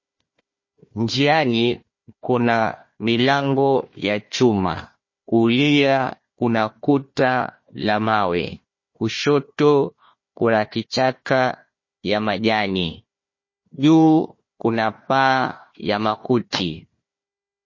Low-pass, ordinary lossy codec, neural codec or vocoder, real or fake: 7.2 kHz; MP3, 32 kbps; codec, 16 kHz, 1 kbps, FunCodec, trained on Chinese and English, 50 frames a second; fake